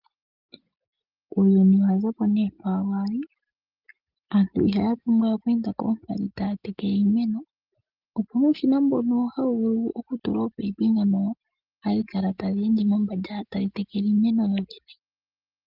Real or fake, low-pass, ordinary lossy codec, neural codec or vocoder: real; 5.4 kHz; Opus, 32 kbps; none